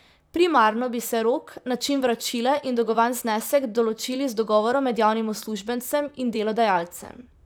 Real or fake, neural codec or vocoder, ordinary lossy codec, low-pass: fake; vocoder, 44.1 kHz, 128 mel bands, Pupu-Vocoder; none; none